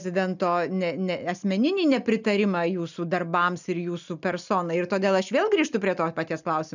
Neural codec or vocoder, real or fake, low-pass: none; real; 7.2 kHz